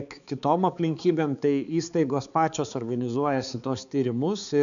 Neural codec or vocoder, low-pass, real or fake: codec, 16 kHz, 4 kbps, X-Codec, HuBERT features, trained on balanced general audio; 7.2 kHz; fake